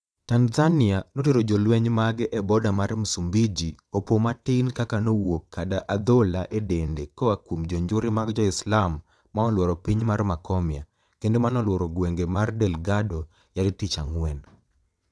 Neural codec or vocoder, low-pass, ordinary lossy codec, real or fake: vocoder, 22.05 kHz, 80 mel bands, WaveNeXt; none; none; fake